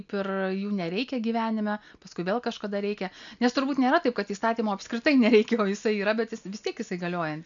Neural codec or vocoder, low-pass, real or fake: none; 7.2 kHz; real